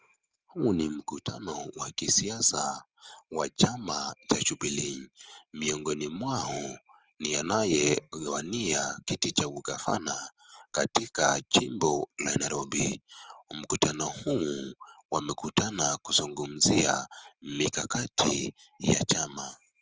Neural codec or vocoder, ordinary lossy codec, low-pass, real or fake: none; Opus, 24 kbps; 7.2 kHz; real